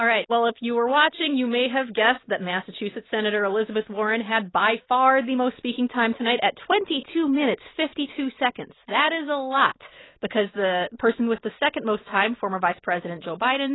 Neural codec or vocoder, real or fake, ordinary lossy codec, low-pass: none; real; AAC, 16 kbps; 7.2 kHz